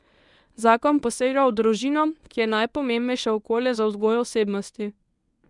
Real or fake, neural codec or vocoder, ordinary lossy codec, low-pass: fake; codec, 24 kHz, 0.9 kbps, WavTokenizer, medium speech release version 1; none; 10.8 kHz